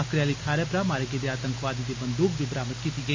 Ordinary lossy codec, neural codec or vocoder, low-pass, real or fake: MP3, 64 kbps; none; 7.2 kHz; real